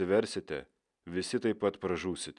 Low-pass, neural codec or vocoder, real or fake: 10.8 kHz; none; real